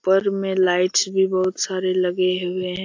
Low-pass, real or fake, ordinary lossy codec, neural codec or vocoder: 7.2 kHz; real; AAC, 48 kbps; none